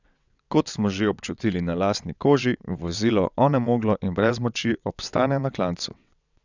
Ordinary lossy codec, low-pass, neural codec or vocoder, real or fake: none; 7.2 kHz; vocoder, 22.05 kHz, 80 mel bands, WaveNeXt; fake